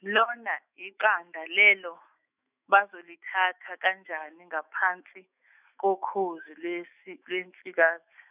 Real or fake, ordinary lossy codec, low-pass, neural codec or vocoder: fake; none; 3.6 kHz; autoencoder, 48 kHz, 128 numbers a frame, DAC-VAE, trained on Japanese speech